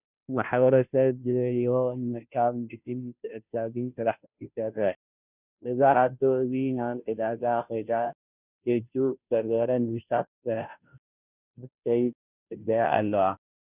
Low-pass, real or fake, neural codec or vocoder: 3.6 kHz; fake; codec, 16 kHz, 0.5 kbps, FunCodec, trained on Chinese and English, 25 frames a second